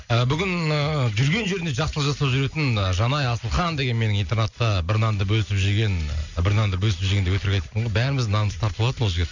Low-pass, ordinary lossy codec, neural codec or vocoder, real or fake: 7.2 kHz; none; none; real